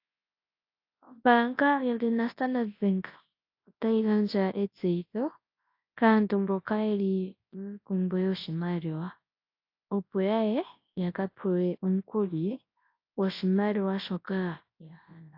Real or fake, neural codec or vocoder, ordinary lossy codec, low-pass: fake; codec, 24 kHz, 0.9 kbps, WavTokenizer, large speech release; AAC, 32 kbps; 5.4 kHz